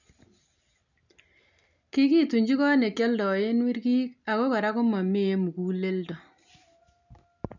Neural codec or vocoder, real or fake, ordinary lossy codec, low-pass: none; real; none; 7.2 kHz